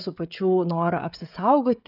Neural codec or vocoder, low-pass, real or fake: codec, 16 kHz, 16 kbps, FreqCodec, smaller model; 5.4 kHz; fake